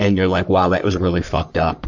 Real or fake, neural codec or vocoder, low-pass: fake; codec, 44.1 kHz, 3.4 kbps, Pupu-Codec; 7.2 kHz